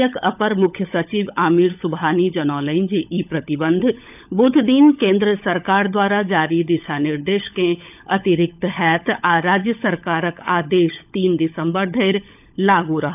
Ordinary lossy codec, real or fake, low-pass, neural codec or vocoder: none; fake; 3.6 kHz; codec, 16 kHz, 16 kbps, FunCodec, trained on LibriTTS, 50 frames a second